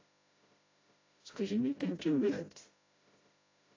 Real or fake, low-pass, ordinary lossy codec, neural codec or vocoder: fake; 7.2 kHz; AAC, 32 kbps; codec, 16 kHz, 0.5 kbps, FreqCodec, smaller model